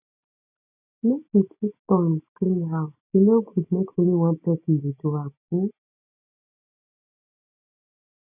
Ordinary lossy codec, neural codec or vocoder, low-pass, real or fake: none; none; 3.6 kHz; real